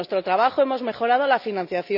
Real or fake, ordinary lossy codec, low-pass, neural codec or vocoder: real; none; 5.4 kHz; none